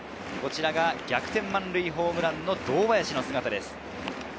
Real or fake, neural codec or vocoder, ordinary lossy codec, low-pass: real; none; none; none